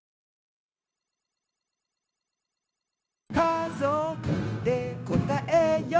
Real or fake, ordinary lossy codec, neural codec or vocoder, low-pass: fake; none; codec, 16 kHz, 0.9 kbps, LongCat-Audio-Codec; none